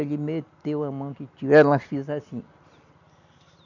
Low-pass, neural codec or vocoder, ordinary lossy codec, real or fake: 7.2 kHz; none; none; real